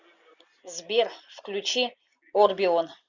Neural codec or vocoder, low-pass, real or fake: none; 7.2 kHz; real